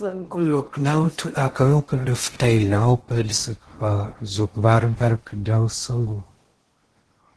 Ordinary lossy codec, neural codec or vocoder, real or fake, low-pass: Opus, 16 kbps; codec, 16 kHz in and 24 kHz out, 0.6 kbps, FocalCodec, streaming, 2048 codes; fake; 10.8 kHz